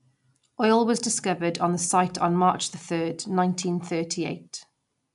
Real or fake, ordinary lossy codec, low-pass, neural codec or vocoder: real; none; 10.8 kHz; none